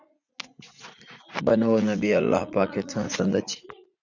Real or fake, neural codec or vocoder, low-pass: fake; vocoder, 44.1 kHz, 80 mel bands, Vocos; 7.2 kHz